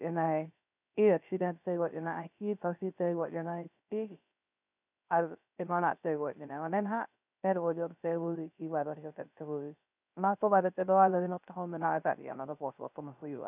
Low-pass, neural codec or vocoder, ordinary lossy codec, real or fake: 3.6 kHz; codec, 16 kHz, 0.3 kbps, FocalCodec; none; fake